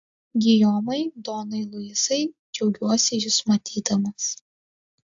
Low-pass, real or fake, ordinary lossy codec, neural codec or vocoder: 7.2 kHz; real; AAC, 64 kbps; none